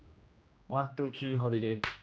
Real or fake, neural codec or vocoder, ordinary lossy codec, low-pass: fake; codec, 16 kHz, 1 kbps, X-Codec, HuBERT features, trained on general audio; none; none